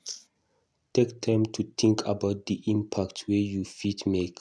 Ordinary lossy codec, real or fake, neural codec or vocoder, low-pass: none; real; none; none